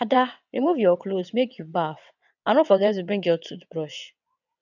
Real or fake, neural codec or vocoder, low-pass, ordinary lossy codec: fake; vocoder, 22.05 kHz, 80 mel bands, WaveNeXt; 7.2 kHz; none